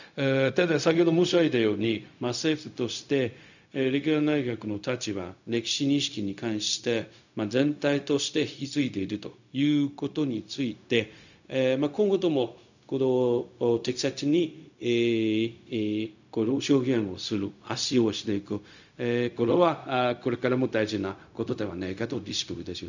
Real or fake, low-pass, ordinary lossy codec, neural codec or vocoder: fake; 7.2 kHz; none; codec, 16 kHz, 0.4 kbps, LongCat-Audio-Codec